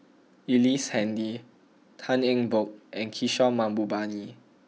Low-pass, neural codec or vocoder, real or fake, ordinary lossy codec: none; none; real; none